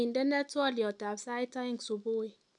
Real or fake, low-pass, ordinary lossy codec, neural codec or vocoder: real; 10.8 kHz; none; none